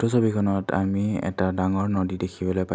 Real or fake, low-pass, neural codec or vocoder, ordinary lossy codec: real; none; none; none